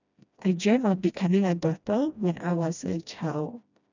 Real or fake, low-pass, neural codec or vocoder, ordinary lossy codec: fake; 7.2 kHz; codec, 16 kHz, 1 kbps, FreqCodec, smaller model; none